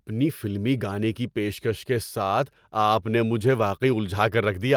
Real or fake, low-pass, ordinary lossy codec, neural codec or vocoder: real; 19.8 kHz; Opus, 32 kbps; none